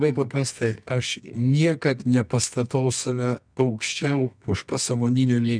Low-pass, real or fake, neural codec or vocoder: 9.9 kHz; fake; codec, 24 kHz, 0.9 kbps, WavTokenizer, medium music audio release